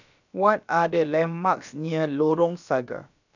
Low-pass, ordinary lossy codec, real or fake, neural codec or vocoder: 7.2 kHz; none; fake; codec, 16 kHz, about 1 kbps, DyCAST, with the encoder's durations